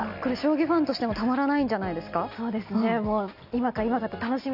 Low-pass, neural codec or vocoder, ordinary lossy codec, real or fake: 5.4 kHz; none; none; real